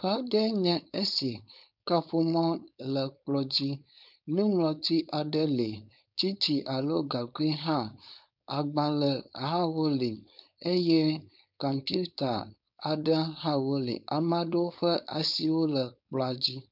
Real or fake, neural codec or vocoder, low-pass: fake; codec, 16 kHz, 4.8 kbps, FACodec; 5.4 kHz